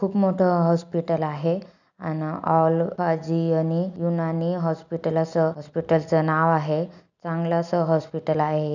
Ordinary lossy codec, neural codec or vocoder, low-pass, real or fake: none; none; 7.2 kHz; real